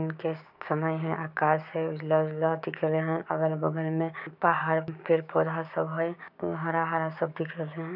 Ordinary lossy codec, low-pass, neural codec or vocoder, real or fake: none; 5.4 kHz; codec, 16 kHz, 6 kbps, DAC; fake